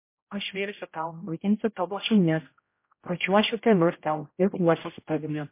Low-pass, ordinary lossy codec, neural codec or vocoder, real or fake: 3.6 kHz; MP3, 24 kbps; codec, 16 kHz, 0.5 kbps, X-Codec, HuBERT features, trained on general audio; fake